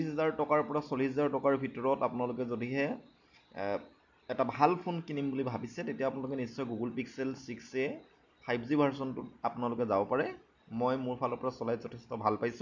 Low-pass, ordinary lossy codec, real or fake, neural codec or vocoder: 7.2 kHz; none; real; none